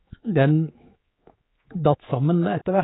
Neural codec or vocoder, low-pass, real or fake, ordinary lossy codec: codec, 16 kHz, 4 kbps, X-Codec, HuBERT features, trained on general audio; 7.2 kHz; fake; AAC, 16 kbps